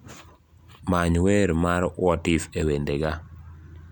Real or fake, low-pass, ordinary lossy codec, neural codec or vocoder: real; 19.8 kHz; none; none